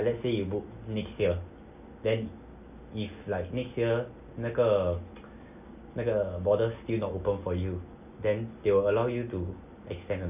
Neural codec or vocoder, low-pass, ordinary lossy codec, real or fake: none; 3.6 kHz; none; real